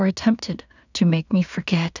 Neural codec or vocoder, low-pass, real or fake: codec, 16 kHz in and 24 kHz out, 0.4 kbps, LongCat-Audio-Codec, two codebook decoder; 7.2 kHz; fake